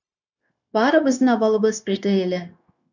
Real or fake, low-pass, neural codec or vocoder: fake; 7.2 kHz; codec, 16 kHz, 0.9 kbps, LongCat-Audio-Codec